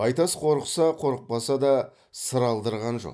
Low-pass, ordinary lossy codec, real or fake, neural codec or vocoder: none; none; real; none